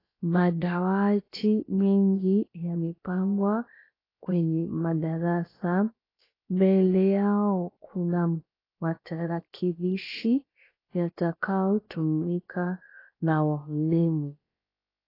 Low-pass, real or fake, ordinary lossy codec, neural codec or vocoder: 5.4 kHz; fake; AAC, 24 kbps; codec, 16 kHz, about 1 kbps, DyCAST, with the encoder's durations